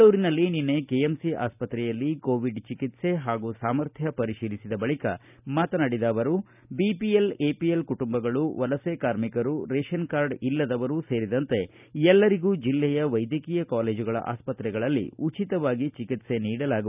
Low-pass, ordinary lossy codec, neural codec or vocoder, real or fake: 3.6 kHz; none; none; real